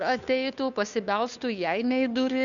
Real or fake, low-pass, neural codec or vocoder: fake; 7.2 kHz; codec, 16 kHz, 2 kbps, FunCodec, trained on Chinese and English, 25 frames a second